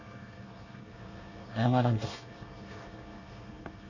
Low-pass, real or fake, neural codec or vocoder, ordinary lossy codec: 7.2 kHz; fake; codec, 24 kHz, 1 kbps, SNAC; none